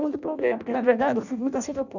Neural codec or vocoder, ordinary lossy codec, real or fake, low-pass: codec, 16 kHz in and 24 kHz out, 0.6 kbps, FireRedTTS-2 codec; none; fake; 7.2 kHz